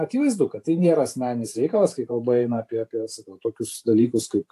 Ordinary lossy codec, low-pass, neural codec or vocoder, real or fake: AAC, 64 kbps; 14.4 kHz; vocoder, 44.1 kHz, 128 mel bands every 512 samples, BigVGAN v2; fake